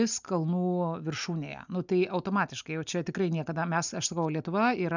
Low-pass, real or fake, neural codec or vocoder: 7.2 kHz; real; none